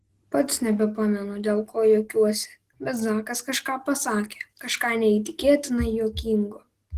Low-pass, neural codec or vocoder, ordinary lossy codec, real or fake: 14.4 kHz; none; Opus, 16 kbps; real